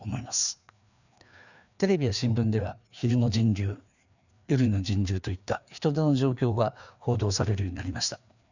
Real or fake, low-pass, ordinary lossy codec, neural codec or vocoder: fake; 7.2 kHz; none; codec, 16 kHz, 2 kbps, FreqCodec, larger model